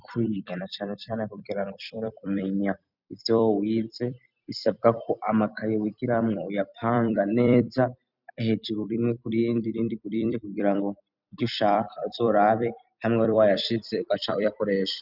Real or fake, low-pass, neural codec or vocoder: fake; 5.4 kHz; vocoder, 44.1 kHz, 128 mel bands every 256 samples, BigVGAN v2